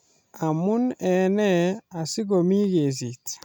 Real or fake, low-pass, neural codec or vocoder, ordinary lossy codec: real; none; none; none